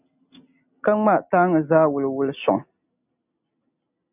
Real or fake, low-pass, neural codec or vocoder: real; 3.6 kHz; none